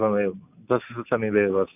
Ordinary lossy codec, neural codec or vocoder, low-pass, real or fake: none; none; 3.6 kHz; real